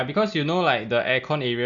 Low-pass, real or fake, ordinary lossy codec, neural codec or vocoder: 7.2 kHz; real; none; none